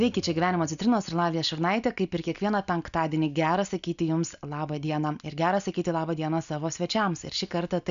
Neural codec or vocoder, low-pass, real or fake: none; 7.2 kHz; real